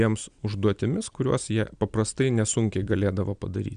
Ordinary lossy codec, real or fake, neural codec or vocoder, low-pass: Opus, 64 kbps; real; none; 9.9 kHz